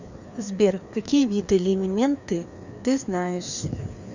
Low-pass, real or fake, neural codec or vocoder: 7.2 kHz; fake; codec, 16 kHz, 2 kbps, FreqCodec, larger model